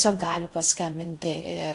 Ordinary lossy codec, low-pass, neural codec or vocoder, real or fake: AAC, 48 kbps; 10.8 kHz; codec, 16 kHz in and 24 kHz out, 0.6 kbps, FocalCodec, streaming, 4096 codes; fake